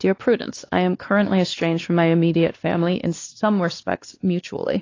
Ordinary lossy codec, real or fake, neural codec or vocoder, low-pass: AAC, 32 kbps; fake; codec, 16 kHz, 2 kbps, X-Codec, WavLM features, trained on Multilingual LibriSpeech; 7.2 kHz